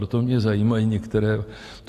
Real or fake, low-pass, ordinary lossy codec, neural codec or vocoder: real; 14.4 kHz; AAC, 64 kbps; none